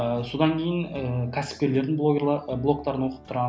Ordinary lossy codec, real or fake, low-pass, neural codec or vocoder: none; real; none; none